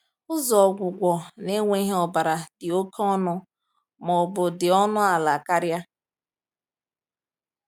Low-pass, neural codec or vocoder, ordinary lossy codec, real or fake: 19.8 kHz; none; none; real